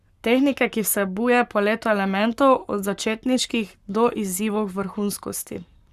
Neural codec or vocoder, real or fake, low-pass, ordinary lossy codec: codec, 44.1 kHz, 7.8 kbps, Pupu-Codec; fake; 14.4 kHz; Opus, 64 kbps